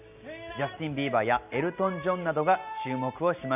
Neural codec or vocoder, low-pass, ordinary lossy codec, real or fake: none; 3.6 kHz; none; real